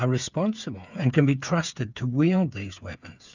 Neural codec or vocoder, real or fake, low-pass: codec, 16 kHz, 8 kbps, FreqCodec, smaller model; fake; 7.2 kHz